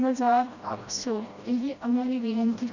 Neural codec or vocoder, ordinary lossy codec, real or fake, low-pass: codec, 16 kHz, 1 kbps, FreqCodec, smaller model; none; fake; 7.2 kHz